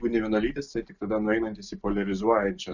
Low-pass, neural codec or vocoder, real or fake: 7.2 kHz; none; real